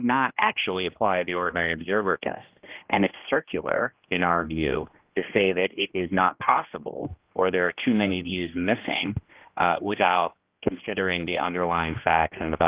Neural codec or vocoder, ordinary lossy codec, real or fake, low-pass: codec, 16 kHz, 1 kbps, X-Codec, HuBERT features, trained on general audio; Opus, 32 kbps; fake; 3.6 kHz